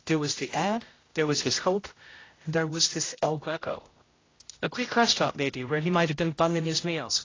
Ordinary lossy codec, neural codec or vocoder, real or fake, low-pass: AAC, 32 kbps; codec, 16 kHz, 0.5 kbps, X-Codec, HuBERT features, trained on general audio; fake; 7.2 kHz